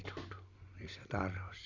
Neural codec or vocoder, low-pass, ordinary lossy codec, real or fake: none; 7.2 kHz; Opus, 64 kbps; real